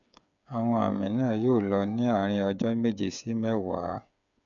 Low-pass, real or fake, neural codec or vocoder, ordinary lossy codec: 7.2 kHz; fake; codec, 16 kHz, 8 kbps, FreqCodec, smaller model; none